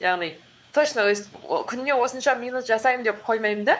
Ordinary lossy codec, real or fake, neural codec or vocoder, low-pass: none; fake; codec, 16 kHz, 4 kbps, X-Codec, WavLM features, trained on Multilingual LibriSpeech; none